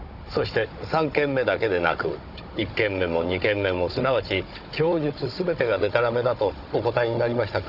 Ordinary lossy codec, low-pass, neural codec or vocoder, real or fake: none; 5.4 kHz; codec, 16 kHz, 16 kbps, FunCodec, trained on Chinese and English, 50 frames a second; fake